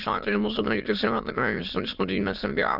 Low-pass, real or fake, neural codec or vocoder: 5.4 kHz; fake; autoencoder, 22.05 kHz, a latent of 192 numbers a frame, VITS, trained on many speakers